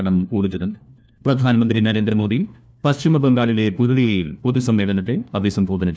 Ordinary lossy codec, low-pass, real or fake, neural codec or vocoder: none; none; fake; codec, 16 kHz, 1 kbps, FunCodec, trained on LibriTTS, 50 frames a second